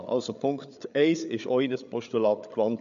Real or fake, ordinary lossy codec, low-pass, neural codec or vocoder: fake; AAC, 96 kbps; 7.2 kHz; codec, 16 kHz, 8 kbps, FreqCodec, larger model